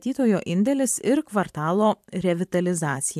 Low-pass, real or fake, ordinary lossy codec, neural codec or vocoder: 14.4 kHz; fake; AAC, 96 kbps; vocoder, 44.1 kHz, 128 mel bands every 512 samples, BigVGAN v2